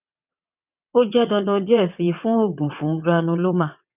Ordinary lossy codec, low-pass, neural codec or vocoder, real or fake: none; 3.6 kHz; vocoder, 22.05 kHz, 80 mel bands, WaveNeXt; fake